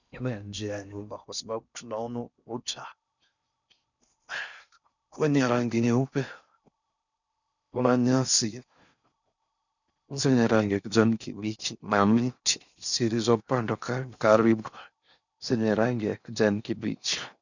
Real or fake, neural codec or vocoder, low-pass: fake; codec, 16 kHz in and 24 kHz out, 0.6 kbps, FocalCodec, streaming, 4096 codes; 7.2 kHz